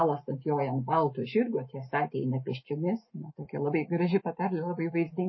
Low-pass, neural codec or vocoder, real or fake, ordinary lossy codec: 7.2 kHz; none; real; MP3, 24 kbps